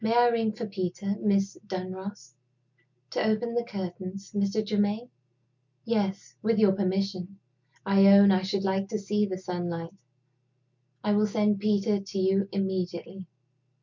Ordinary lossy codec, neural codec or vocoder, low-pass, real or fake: MP3, 64 kbps; none; 7.2 kHz; real